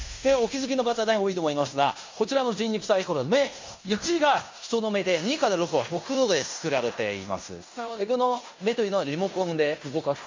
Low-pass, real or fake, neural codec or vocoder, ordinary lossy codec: 7.2 kHz; fake; codec, 16 kHz in and 24 kHz out, 0.9 kbps, LongCat-Audio-Codec, fine tuned four codebook decoder; MP3, 48 kbps